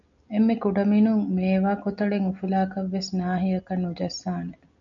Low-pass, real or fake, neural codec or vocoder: 7.2 kHz; real; none